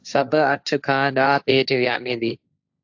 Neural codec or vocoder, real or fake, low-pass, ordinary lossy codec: codec, 16 kHz, 1.1 kbps, Voila-Tokenizer; fake; 7.2 kHz; AAC, 48 kbps